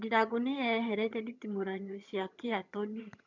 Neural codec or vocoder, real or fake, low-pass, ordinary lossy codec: vocoder, 22.05 kHz, 80 mel bands, HiFi-GAN; fake; 7.2 kHz; none